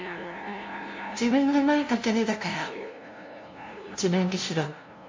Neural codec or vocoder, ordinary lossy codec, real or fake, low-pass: codec, 16 kHz, 0.5 kbps, FunCodec, trained on LibriTTS, 25 frames a second; MP3, 48 kbps; fake; 7.2 kHz